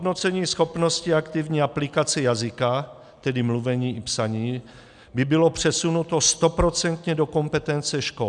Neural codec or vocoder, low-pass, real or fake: none; 10.8 kHz; real